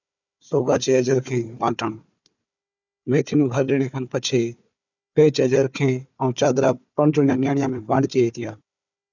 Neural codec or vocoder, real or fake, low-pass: codec, 16 kHz, 4 kbps, FunCodec, trained on Chinese and English, 50 frames a second; fake; 7.2 kHz